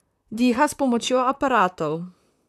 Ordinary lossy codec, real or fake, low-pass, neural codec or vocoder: none; fake; 14.4 kHz; vocoder, 44.1 kHz, 128 mel bands, Pupu-Vocoder